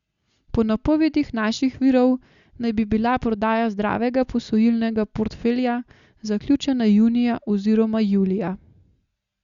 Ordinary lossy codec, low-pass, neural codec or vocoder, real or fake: Opus, 64 kbps; 7.2 kHz; none; real